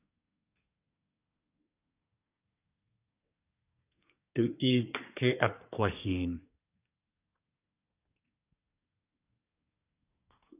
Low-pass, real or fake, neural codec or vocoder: 3.6 kHz; fake; codec, 24 kHz, 1 kbps, SNAC